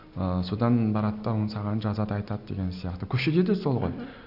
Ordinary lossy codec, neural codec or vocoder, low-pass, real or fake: none; none; 5.4 kHz; real